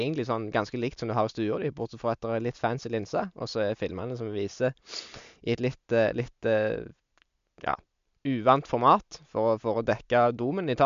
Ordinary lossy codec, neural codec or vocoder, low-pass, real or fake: MP3, 64 kbps; none; 7.2 kHz; real